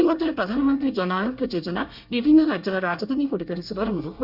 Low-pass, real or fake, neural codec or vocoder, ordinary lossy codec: 5.4 kHz; fake; codec, 24 kHz, 1 kbps, SNAC; Opus, 64 kbps